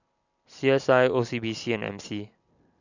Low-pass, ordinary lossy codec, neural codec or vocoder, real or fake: 7.2 kHz; none; none; real